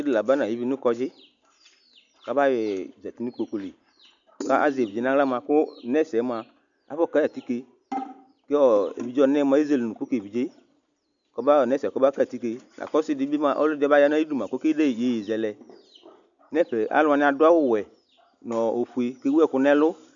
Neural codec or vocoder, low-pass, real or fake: none; 7.2 kHz; real